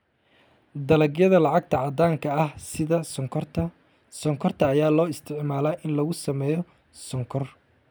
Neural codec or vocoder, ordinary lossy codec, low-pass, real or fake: none; none; none; real